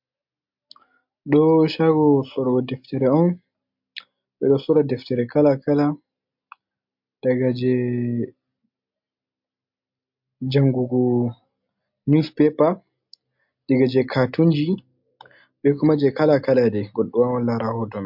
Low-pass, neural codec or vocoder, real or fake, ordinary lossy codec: 5.4 kHz; none; real; MP3, 48 kbps